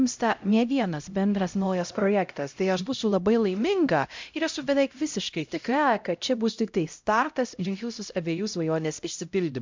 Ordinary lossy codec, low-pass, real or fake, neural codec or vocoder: MP3, 64 kbps; 7.2 kHz; fake; codec, 16 kHz, 0.5 kbps, X-Codec, HuBERT features, trained on LibriSpeech